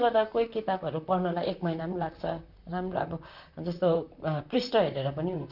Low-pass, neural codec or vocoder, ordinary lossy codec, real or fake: 5.4 kHz; vocoder, 44.1 kHz, 128 mel bands, Pupu-Vocoder; none; fake